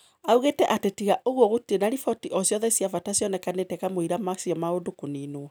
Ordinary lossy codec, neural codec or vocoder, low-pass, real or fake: none; none; none; real